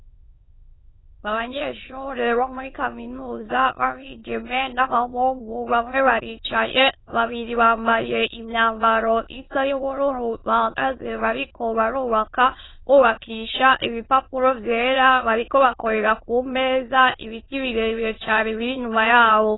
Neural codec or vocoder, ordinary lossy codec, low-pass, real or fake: autoencoder, 22.05 kHz, a latent of 192 numbers a frame, VITS, trained on many speakers; AAC, 16 kbps; 7.2 kHz; fake